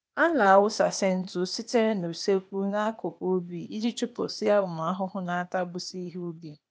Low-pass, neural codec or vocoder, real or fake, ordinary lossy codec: none; codec, 16 kHz, 0.8 kbps, ZipCodec; fake; none